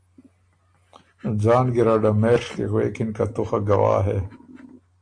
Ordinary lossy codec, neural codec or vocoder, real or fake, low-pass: AAC, 48 kbps; none; real; 9.9 kHz